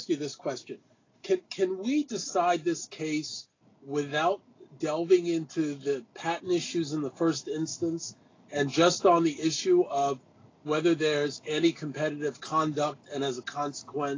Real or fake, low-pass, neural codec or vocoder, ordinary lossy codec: real; 7.2 kHz; none; AAC, 32 kbps